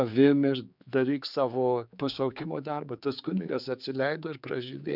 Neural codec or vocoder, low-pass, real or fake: codec, 16 kHz, 4 kbps, X-Codec, HuBERT features, trained on general audio; 5.4 kHz; fake